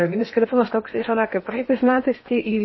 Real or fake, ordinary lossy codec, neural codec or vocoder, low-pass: fake; MP3, 24 kbps; codec, 16 kHz in and 24 kHz out, 0.8 kbps, FocalCodec, streaming, 65536 codes; 7.2 kHz